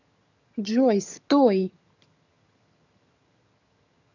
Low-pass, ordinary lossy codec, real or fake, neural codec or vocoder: 7.2 kHz; none; fake; vocoder, 22.05 kHz, 80 mel bands, HiFi-GAN